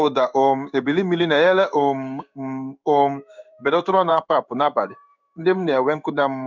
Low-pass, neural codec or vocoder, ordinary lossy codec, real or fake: 7.2 kHz; codec, 16 kHz in and 24 kHz out, 1 kbps, XY-Tokenizer; none; fake